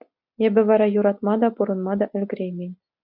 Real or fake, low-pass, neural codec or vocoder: real; 5.4 kHz; none